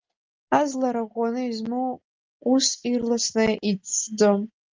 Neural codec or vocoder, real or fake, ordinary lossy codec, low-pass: none; real; Opus, 32 kbps; 7.2 kHz